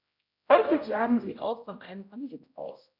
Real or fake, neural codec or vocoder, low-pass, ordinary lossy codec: fake; codec, 16 kHz, 0.5 kbps, X-Codec, HuBERT features, trained on general audio; 5.4 kHz; none